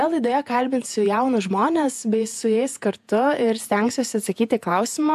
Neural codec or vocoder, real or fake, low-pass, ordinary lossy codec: vocoder, 48 kHz, 128 mel bands, Vocos; fake; 14.4 kHz; AAC, 96 kbps